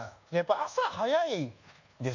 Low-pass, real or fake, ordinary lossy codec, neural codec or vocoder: 7.2 kHz; fake; none; codec, 24 kHz, 1.2 kbps, DualCodec